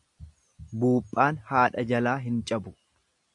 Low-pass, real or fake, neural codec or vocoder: 10.8 kHz; real; none